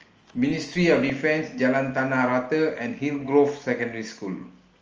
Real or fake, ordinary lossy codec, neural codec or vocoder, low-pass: real; Opus, 24 kbps; none; 7.2 kHz